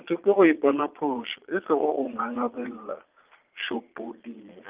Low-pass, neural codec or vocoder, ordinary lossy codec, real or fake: 3.6 kHz; vocoder, 22.05 kHz, 80 mel bands, Vocos; Opus, 32 kbps; fake